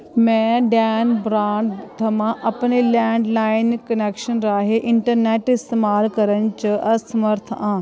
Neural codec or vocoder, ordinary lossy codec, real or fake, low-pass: none; none; real; none